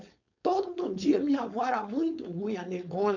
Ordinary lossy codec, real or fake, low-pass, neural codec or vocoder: none; fake; 7.2 kHz; codec, 16 kHz, 4.8 kbps, FACodec